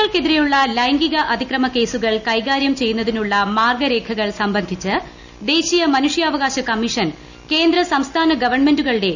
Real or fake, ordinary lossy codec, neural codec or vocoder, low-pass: real; none; none; 7.2 kHz